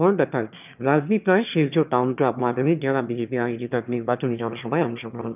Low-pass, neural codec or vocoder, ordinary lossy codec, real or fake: 3.6 kHz; autoencoder, 22.05 kHz, a latent of 192 numbers a frame, VITS, trained on one speaker; none; fake